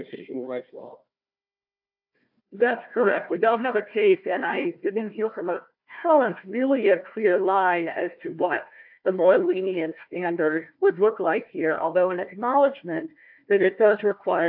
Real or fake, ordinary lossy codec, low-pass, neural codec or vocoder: fake; AAC, 48 kbps; 5.4 kHz; codec, 16 kHz, 1 kbps, FunCodec, trained on Chinese and English, 50 frames a second